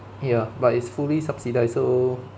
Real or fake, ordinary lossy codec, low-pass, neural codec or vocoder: real; none; none; none